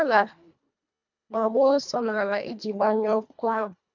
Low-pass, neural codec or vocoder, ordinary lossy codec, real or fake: 7.2 kHz; codec, 24 kHz, 1.5 kbps, HILCodec; none; fake